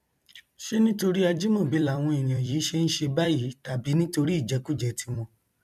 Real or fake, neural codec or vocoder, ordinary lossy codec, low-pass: fake; vocoder, 44.1 kHz, 128 mel bands every 256 samples, BigVGAN v2; none; 14.4 kHz